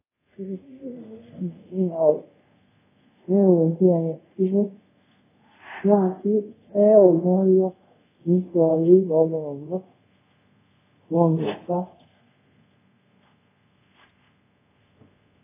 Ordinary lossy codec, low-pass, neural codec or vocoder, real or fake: AAC, 16 kbps; 3.6 kHz; codec, 24 kHz, 0.5 kbps, DualCodec; fake